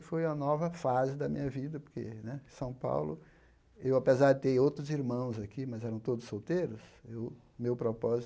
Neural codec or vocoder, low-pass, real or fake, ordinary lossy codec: none; none; real; none